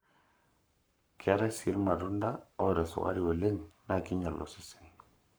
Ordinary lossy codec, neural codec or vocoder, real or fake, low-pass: none; codec, 44.1 kHz, 7.8 kbps, Pupu-Codec; fake; none